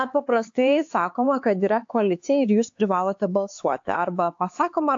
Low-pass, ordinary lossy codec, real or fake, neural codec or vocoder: 7.2 kHz; AAC, 48 kbps; fake; codec, 16 kHz, 4 kbps, X-Codec, HuBERT features, trained on LibriSpeech